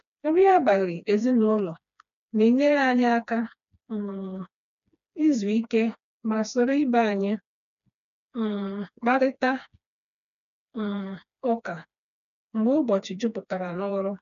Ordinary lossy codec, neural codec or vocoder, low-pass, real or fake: none; codec, 16 kHz, 2 kbps, FreqCodec, smaller model; 7.2 kHz; fake